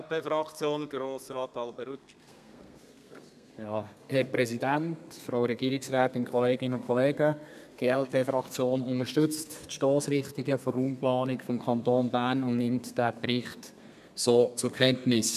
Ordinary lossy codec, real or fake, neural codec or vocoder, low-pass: none; fake; codec, 32 kHz, 1.9 kbps, SNAC; 14.4 kHz